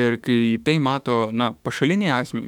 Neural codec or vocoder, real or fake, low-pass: autoencoder, 48 kHz, 32 numbers a frame, DAC-VAE, trained on Japanese speech; fake; 19.8 kHz